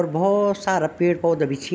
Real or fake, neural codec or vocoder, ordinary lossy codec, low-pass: real; none; none; none